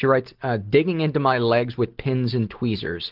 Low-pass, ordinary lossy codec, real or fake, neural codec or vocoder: 5.4 kHz; Opus, 16 kbps; real; none